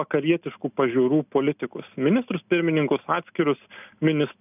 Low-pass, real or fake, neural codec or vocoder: 3.6 kHz; real; none